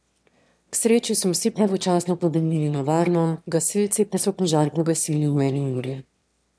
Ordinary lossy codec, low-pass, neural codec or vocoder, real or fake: none; none; autoencoder, 22.05 kHz, a latent of 192 numbers a frame, VITS, trained on one speaker; fake